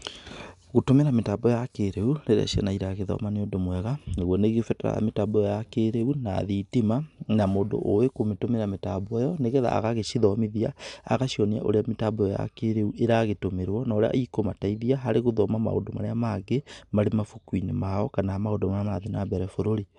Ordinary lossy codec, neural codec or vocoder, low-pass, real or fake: none; none; 10.8 kHz; real